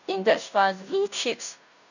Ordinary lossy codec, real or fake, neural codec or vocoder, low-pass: none; fake; codec, 16 kHz, 0.5 kbps, FunCodec, trained on Chinese and English, 25 frames a second; 7.2 kHz